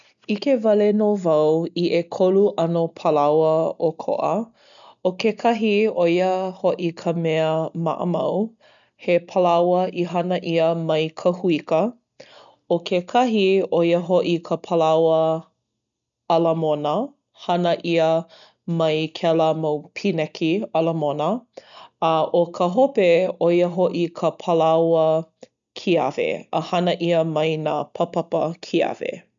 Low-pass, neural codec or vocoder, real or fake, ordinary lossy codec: 7.2 kHz; none; real; none